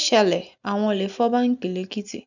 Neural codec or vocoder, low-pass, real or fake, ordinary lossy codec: none; 7.2 kHz; real; none